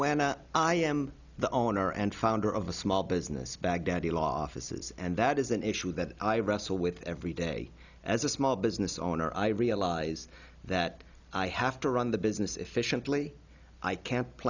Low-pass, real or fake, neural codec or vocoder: 7.2 kHz; real; none